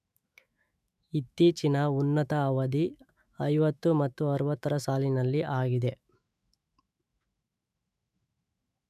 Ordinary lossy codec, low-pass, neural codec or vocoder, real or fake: none; 14.4 kHz; autoencoder, 48 kHz, 128 numbers a frame, DAC-VAE, trained on Japanese speech; fake